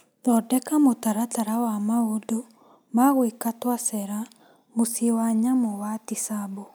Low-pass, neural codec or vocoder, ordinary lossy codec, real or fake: none; none; none; real